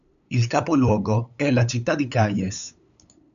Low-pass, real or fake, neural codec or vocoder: 7.2 kHz; fake; codec, 16 kHz, 8 kbps, FunCodec, trained on LibriTTS, 25 frames a second